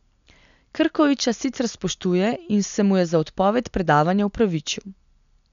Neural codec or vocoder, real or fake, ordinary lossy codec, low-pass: none; real; none; 7.2 kHz